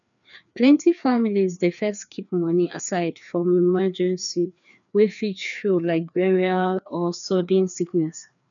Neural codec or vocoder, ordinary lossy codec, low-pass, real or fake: codec, 16 kHz, 2 kbps, FreqCodec, larger model; none; 7.2 kHz; fake